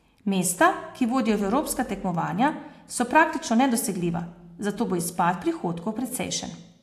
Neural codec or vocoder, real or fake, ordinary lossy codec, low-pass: none; real; AAC, 64 kbps; 14.4 kHz